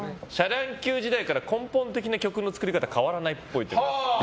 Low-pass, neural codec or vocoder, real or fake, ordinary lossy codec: none; none; real; none